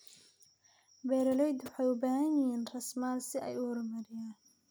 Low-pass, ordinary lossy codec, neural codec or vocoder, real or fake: none; none; none; real